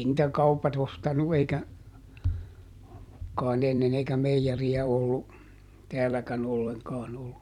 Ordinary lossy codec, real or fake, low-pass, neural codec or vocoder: none; fake; 19.8 kHz; vocoder, 44.1 kHz, 128 mel bands every 256 samples, BigVGAN v2